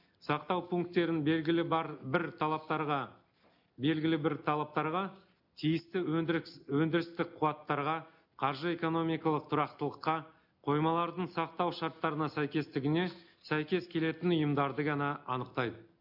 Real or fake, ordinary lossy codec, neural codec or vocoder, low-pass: real; none; none; 5.4 kHz